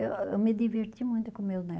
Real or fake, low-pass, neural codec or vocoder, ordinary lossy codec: real; none; none; none